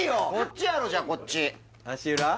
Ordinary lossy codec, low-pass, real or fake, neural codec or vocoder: none; none; real; none